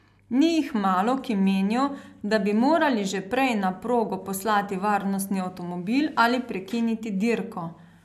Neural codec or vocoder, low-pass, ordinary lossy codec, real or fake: none; 14.4 kHz; MP3, 96 kbps; real